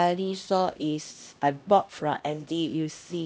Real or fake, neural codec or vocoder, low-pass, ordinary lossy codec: fake; codec, 16 kHz, 1 kbps, X-Codec, HuBERT features, trained on LibriSpeech; none; none